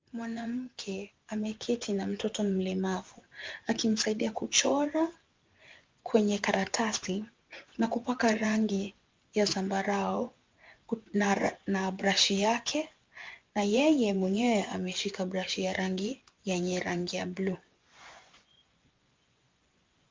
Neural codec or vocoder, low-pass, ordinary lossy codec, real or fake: vocoder, 24 kHz, 100 mel bands, Vocos; 7.2 kHz; Opus, 32 kbps; fake